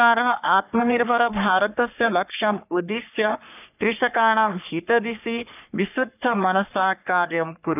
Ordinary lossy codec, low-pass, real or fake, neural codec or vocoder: none; 3.6 kHz; fake; codec, 44.1 kHz, 3.4 kbps, Pupu-Codec